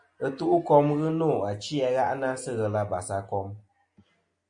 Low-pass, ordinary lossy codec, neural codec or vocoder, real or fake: 9.9 kHz; MP3, 48 kbps; none; real